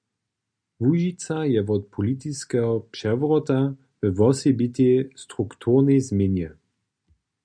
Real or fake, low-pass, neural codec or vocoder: real; 9.9 kHz; none